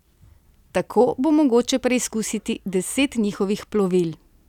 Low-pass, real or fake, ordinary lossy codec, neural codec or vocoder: 19.8 kHz; real; none; none